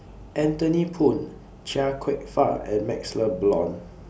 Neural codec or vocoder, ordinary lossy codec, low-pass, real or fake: none; none; none; real